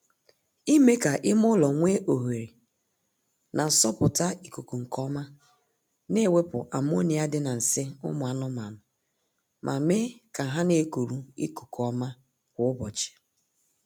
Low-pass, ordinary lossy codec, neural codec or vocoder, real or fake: none; none; none; real